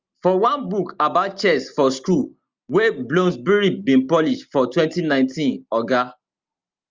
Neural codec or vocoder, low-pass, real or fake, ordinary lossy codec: none; 7.2 kHz; real; Opus, 32 kbps